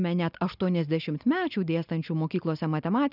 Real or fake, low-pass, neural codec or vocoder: real; 5.4 kHz; none